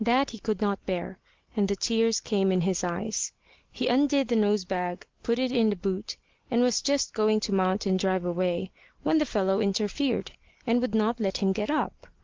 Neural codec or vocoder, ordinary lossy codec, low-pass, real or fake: none; Opus, 16 kbps; 7.2 kHz; real